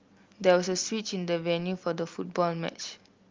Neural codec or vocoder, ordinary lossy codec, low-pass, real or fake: none; Opus, 32 kbps; 7.2 kHz; real